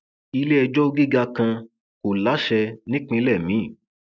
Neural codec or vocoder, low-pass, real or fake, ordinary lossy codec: none; none; real; none